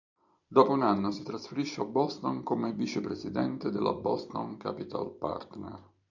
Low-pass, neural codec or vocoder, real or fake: 7.2 kHz; vocoder, 44.1 kHz, 128 mel bands every 256 samples, BigVGAN v2; fake